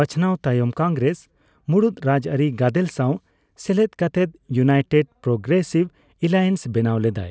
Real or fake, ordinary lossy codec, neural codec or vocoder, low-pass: real; none; none; none